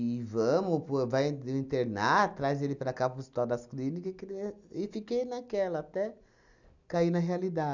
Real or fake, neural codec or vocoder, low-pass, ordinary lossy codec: real; none; 7.2 kHz; none